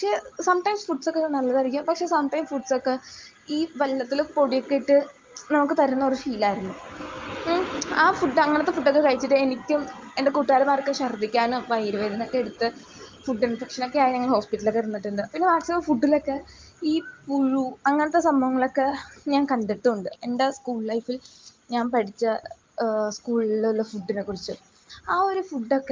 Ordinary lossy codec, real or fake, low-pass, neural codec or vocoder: Opus, 24 kbps; real; 7.2 kHz; none